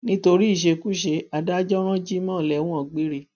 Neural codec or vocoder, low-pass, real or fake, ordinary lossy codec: none; 7.2 kHz; real; none